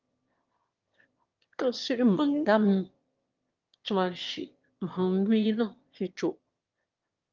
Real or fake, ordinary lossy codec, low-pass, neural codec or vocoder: fake; Opus, 32 kbps; 7.2 kHz; autoencoder, 22.05 kHz, a latent of 192 numbers a frame, VITS, trained on one speaker